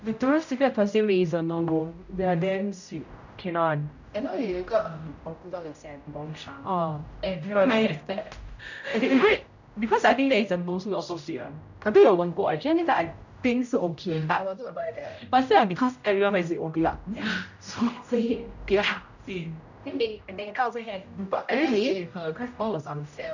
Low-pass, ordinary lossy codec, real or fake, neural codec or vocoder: 7.2 kHz; none; fake; codec, 16 kHz, 0.5 kbps, X-Codec, HuBERT features, trained on general audio